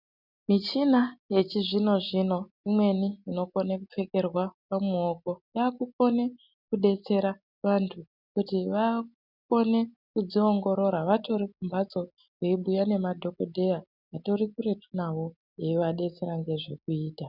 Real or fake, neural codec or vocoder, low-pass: real; none; 5.4 kHz